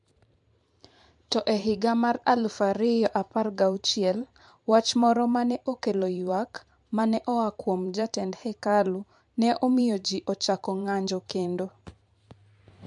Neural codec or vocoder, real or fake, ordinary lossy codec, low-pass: vocoder, 44.1 kHz, 128 mel bands every 512 samples, BigVGAN v2; fake; MP3, 64 kbps; 10.8 kHz